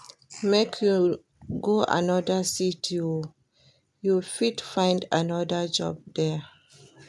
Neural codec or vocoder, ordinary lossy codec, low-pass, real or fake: none; none; none; real